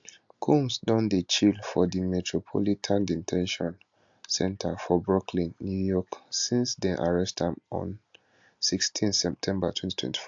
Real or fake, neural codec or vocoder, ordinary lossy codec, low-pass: real; none; AAC, 64 kbps; 7.2 kHz